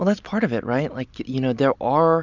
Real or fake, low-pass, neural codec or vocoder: real; 7.2 kHz; none